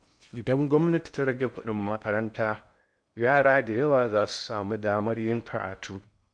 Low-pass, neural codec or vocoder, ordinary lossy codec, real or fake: 9.9 kHz; codec, 16 kHz in and 24 kHz out, 0.6 kbps, FocalCodec, streaming, 2048 codes; none; fake